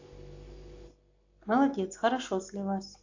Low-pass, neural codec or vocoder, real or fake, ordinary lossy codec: 7.2 kHz; none; real; AAC, 48 kbps